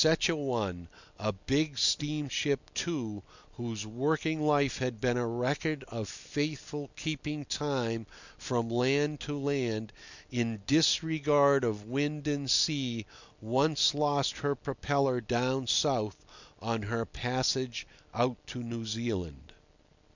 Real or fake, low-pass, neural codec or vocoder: real; 7.2 kHz; none